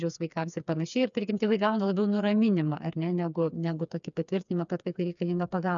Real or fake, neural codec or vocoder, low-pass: fake; codec, 16 kHz, 4 kbps, FreqCodec, smaller model; 7.2 kHz